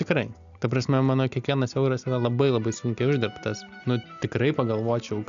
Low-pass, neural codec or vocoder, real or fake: 7.2 kHz; none; real